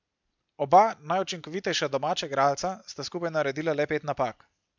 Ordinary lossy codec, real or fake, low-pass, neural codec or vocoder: MP3, 64 kbps; real; 7.2 kHz; none